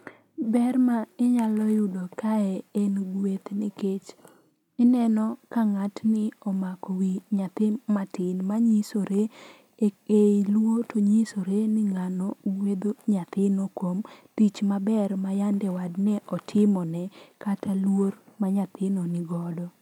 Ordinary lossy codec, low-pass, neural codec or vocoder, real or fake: none; 19.8 kHz; none; real